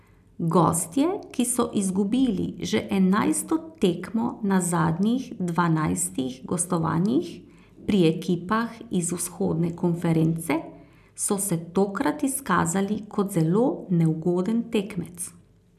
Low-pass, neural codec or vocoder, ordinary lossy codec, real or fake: 14.4 kHz; none; none; real